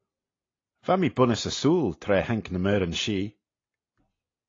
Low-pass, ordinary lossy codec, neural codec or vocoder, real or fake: 7.2 kHz; AAC, 32 kbps; none; real